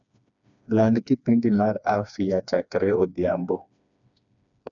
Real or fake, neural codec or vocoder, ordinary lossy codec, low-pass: fake; codec, 16 kHz, 2 kbps, FreqCodec, smaller model; none; 7.2 kHz